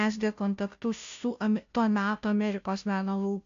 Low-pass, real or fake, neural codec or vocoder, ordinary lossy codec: 7.2 kHz; fake; codec, 16 kHz, 0.5 kbps, FunCodec, trained on Chinese and English, 25 frames a second; AAC, 64 kbps